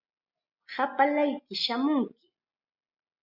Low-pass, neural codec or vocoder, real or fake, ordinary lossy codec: 5.4 kHz; none; real; Opus, 64 kbps